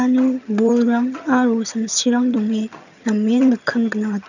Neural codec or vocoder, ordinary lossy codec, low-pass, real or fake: vocoder, 22.05 kHz, 80 mel bands, HiFi-GAN; none; 7.2 kHz; fake